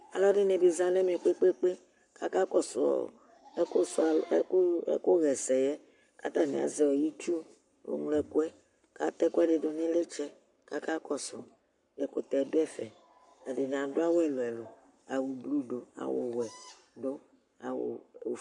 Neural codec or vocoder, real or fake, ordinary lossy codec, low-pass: codec, 44.1 kHz, 7.8 kbps, Pupu-Codec; fake; MP3, 96 kbps; 10.8 kHz